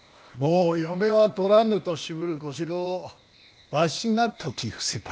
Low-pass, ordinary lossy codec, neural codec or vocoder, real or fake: none; none; codec, 16 kHz, 0.8 kbps, ZipCodec; fake